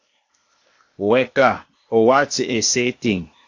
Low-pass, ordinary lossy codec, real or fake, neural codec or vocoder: 7.2 kHz; AAC, 48 kbps; fake; codec, 16 kHz, 0.8 kbps, ZipCodec